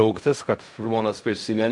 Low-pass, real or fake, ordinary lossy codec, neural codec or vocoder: 10.8 kHz; fake; MP3, 64 kbps; codec, 16 kHz in and 24 kHz out, 0.4 kbps, LongCat-Audio-Codec, fine tuned four codebook decoder